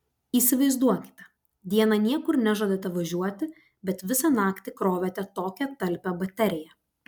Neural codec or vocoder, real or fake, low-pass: none; real; 19.8 kHz